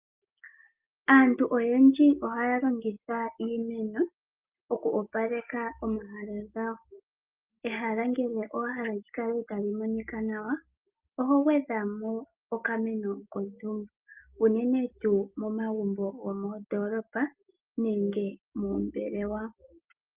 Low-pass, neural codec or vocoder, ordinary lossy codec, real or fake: 3.6 kHz; none; Opus, 32 kbps; real